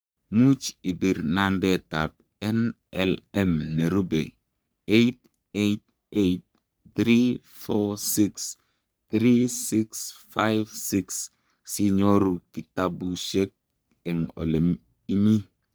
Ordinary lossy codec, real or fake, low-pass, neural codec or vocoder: none; fake; none; codec, 44.1 kHz, 3.4 kbps, Pupu-Codec